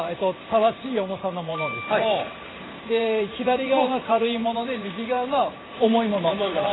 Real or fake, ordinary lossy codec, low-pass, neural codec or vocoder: fake; AAC, 16 kbps; 7.2 kHz; vocoder, 44.1 kHz, 128 mel bands every 512 samples, BigVGAN v2